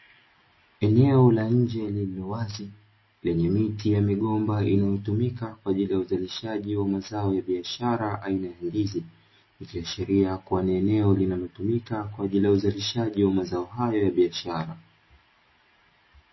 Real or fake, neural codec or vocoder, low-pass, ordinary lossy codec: real; none; 7.2 kHz; MP3, 24 kbps